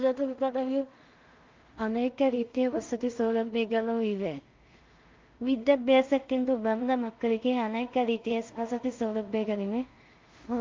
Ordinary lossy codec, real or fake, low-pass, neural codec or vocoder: Opus, 24 kbps; fake; 7.2 kHz; codec, 16 kHz in and 24 kHz out, 0.4 kbps, LongCat-Audio-Codec, two codebook decoder